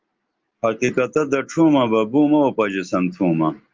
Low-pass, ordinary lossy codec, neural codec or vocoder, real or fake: 7.2 kHz; Opus, 24 kbps; none; real